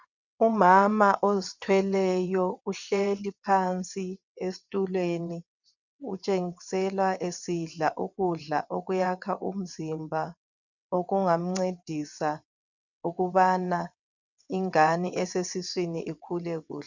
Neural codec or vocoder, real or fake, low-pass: vocoder, 22.05 kHz, 80 mel bands, WaveNeXt; fake; 7.2 kHz